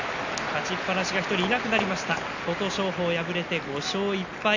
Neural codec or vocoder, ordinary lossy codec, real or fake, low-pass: none; none; real; 7.2 kHz